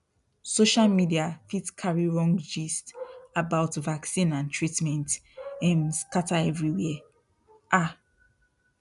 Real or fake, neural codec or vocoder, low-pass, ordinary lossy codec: real; none; 10.8 kHz; none